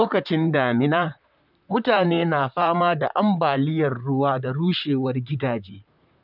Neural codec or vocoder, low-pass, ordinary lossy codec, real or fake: vocoder, 44.1 kHz, 128 mel bands, Pupu-Vocoder; 5.4 kHz; none; fake